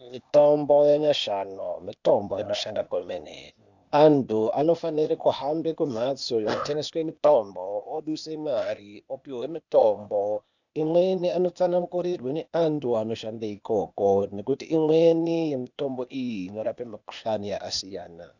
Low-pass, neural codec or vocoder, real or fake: 7.2 kHz; codec, 16 kHz, 0.8 kbps, ZipCodec; fake